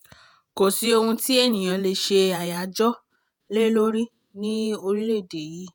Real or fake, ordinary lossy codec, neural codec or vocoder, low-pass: fake; none; vocoder, 48 kHz, 128 mel bands, Vocos; none